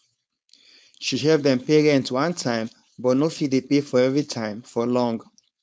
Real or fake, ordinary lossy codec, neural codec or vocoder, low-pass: fake; none; codec, 16 kHz, 4.8 kbps, FACodec; none